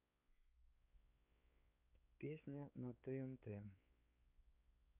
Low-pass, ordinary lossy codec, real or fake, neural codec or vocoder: 3.6 kHz; none; fake; codec, 16 kHz, 4 kbps, X-Codec, WavLM features, trained on Multilingual LibriSpeech